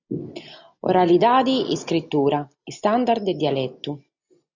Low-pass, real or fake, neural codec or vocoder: 7.2 kHz; real; none